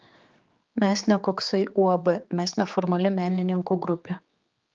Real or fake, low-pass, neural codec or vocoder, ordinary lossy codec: fake; 7.2 kHz; codec, 16 kHz, 4 kbps, X-Codec, HuBERT features, trained on general audio; Opus, 24 kbps